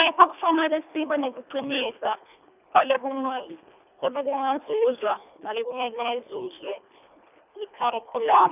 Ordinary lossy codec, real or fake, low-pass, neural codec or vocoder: none; fake; 3.6 kHz; codec, 24 kHz, 1.5 kbps, HILCodec